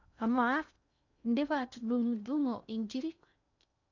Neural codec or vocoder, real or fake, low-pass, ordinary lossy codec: codec, 16 kHz in and 24 kHz out, 0.6 kbps, FocalCodec, streaming, 2048 codes; fake; 7.2 kHz; none